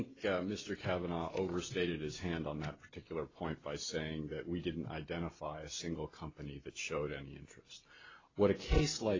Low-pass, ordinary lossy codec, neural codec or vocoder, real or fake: 7.2 kHz; AAC, 32 kbps; none; real